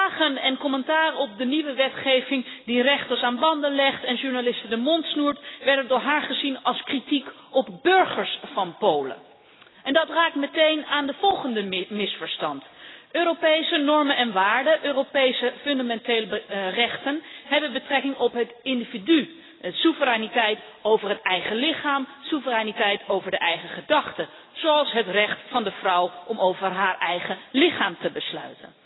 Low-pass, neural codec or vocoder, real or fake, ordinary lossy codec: 7.2 kHz; none; real; AAC, 16 kbps